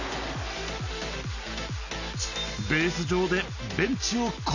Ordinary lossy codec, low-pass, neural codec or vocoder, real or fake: none; 7.2 kHz; none; real